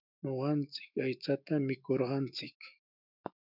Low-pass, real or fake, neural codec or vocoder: 5.4 kHz; fake; autoencoder, 48 kHz, 128 numbers a frame, DAC-VAE, trained on Japanese speech